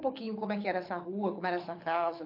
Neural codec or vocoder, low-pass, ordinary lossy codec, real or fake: codec, 24 kHz, 6 kbps, HILCodec; 5.4 kHz; MP3, 32 kbps; fake